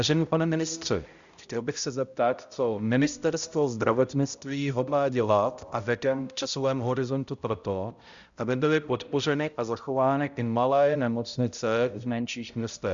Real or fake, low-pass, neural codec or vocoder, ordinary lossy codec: fake; 7.2 kHz; codec, 16 kHz, 0.5 kbps, X-Codec, HuBERT features, trained on balanced general audio; Opus, 64 kbps